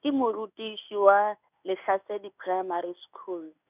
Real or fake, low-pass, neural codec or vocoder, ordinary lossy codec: fake; 3.6 kHz; codec, 16 kHz in and 24 kHz out, 1 kbps, XY-Tokenizer; none